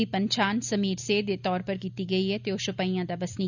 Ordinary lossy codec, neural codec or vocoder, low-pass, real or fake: none; none; 7.2 kHz; real